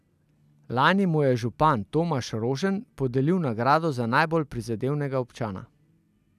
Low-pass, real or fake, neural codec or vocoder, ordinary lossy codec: 14.4 kHz; real; none; none